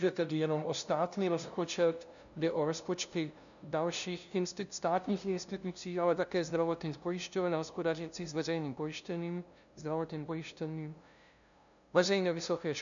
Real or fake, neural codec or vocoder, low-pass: fake; codec, 16 kHz, 0.5 kbps, FunCodec, trained on LibriTTS, 25 frames a second; 7.2 kHz